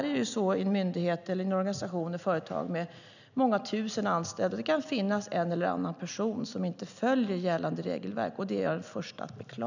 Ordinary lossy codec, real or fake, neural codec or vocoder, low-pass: none; real; none; 7.2 kHz